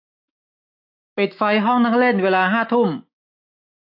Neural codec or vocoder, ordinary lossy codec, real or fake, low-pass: autoencoder, 48 kHz, 128 numbers a frame, DAC-VAE, trained on Japanese speech; AAC, 48 kbps; fake; 5.4 kHz